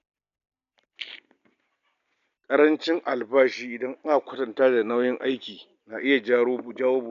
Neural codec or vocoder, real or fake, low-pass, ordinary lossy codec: none; real; 7.2 kHz; none